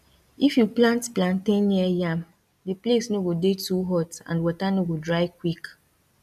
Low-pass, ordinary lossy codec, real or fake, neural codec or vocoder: 14.4 kHz; none; real; none